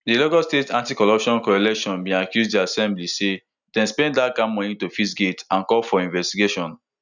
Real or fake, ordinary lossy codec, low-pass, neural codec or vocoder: real; none; 7.2 kHz; none